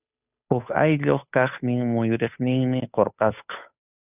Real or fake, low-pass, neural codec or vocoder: fake; 3.6 kHz; codec, 16 kHz, 8 kbps, FunCodec, trained on Chinese and English, 25 frames a second